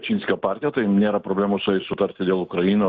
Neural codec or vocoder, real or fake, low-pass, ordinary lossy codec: none; real; 7.2 kHz; Opus, 32 kbps